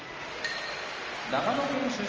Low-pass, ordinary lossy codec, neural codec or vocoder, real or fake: 7.2 kHz; Opus, 24 kbps; none; real